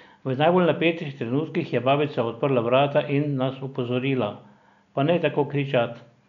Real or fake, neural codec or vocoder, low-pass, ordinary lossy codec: real; none; 7.2 kHz; none